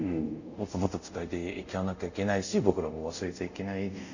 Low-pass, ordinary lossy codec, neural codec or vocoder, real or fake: 7.2 kHz; none; codec, 24 kHz, 0.5 kbps, DualCodec; fake